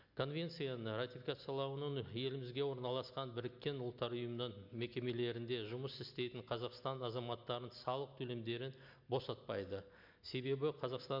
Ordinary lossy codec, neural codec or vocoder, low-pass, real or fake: MP3, 48 kbps; none; 5.4 kHz; real